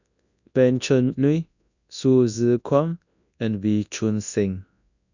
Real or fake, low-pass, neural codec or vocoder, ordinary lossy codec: fake; 7.2 kHz; codec, 24 kHz, 0.9 kbps, WavTokenizer, large speech release; none